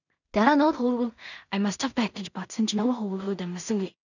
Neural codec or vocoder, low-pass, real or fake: codec, 16 kHz in and 24 kHz out, 0.4 kbps, LongCat-Audio-Codec, two codebook decoder; 7.2 kHz; fake